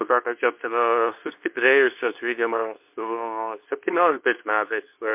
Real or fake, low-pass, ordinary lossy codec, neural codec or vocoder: fake; 3.6 kHz; MP3, 32 kbps; codec, 24 kHz, 0.9 kbps, WavTokenizer, medium speech release version 2